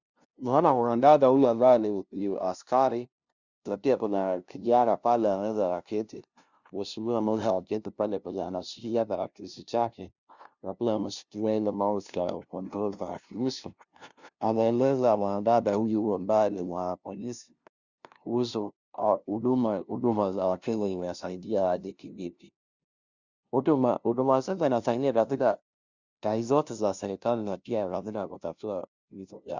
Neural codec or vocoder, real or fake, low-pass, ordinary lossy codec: codec, 16 kHz, 0.5 kbps, FunCodec, trained on LibriTTS, 25 frames a second; fake; 7.2 kHz; Opus, 64 kbps